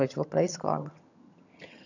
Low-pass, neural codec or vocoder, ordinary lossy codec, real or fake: 7.2 kHz; vocoder, 22.05 kHz, 80 mel bands, HiFi-GAN; none; fake